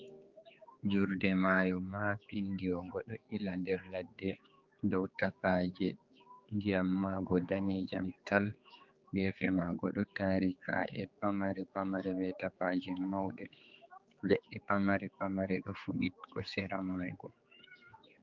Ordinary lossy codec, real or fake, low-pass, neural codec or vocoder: Opus, 24 kbps; fake; 7.2 kHz; codec, 16 kHz, 4 kbps, X-Codec, HuBERT features, trained on general audio